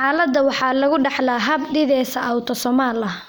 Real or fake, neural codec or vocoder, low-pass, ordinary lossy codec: real; none; none; none